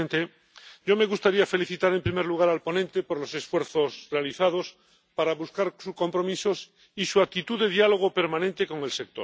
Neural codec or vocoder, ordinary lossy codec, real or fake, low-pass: none; none; real; none